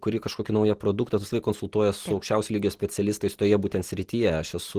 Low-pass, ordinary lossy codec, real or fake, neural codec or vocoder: 14.4 kHz; Opus, 16 kbps; real; none